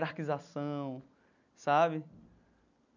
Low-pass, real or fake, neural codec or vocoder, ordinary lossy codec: 7.2 kHz; real; none; none